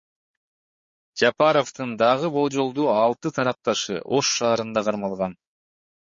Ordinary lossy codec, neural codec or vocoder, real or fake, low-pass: MP3, 32 kbps; codec, 16 kHz, 4 kbps, X-Codec, HuBERT features, trained on balanced general audio; fake; 7.2 kHz